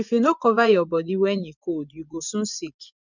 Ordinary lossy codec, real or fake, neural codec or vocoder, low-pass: none; fake; vocoder, 24 kHz, 100 mel bands, Vocos; 7.2 kHz